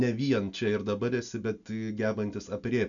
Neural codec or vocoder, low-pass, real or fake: none; 7.2 kHz; real